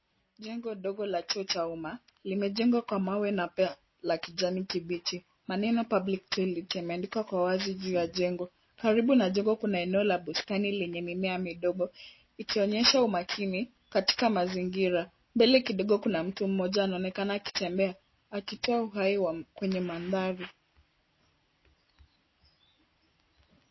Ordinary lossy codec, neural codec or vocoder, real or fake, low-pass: MP3, 24 kbps; none; real; 7.2 kHz